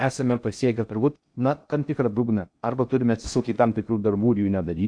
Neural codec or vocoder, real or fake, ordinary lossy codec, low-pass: codec, 16 kHz in and 24 kHz out, 0.6 kbps, FocalCodec, streaming, 2048 codes; fake; AAC, 64 kbps; 9.9 kHz